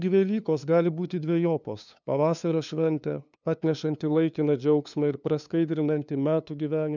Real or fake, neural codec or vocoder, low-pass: fake; codec, 16 kHz, 2 kbps, FunCodec, trained on LibriTTS, 25 frames a second; 7.2 kHz